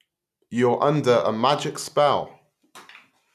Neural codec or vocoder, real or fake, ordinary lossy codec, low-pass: none; real; none; 14.4 kHz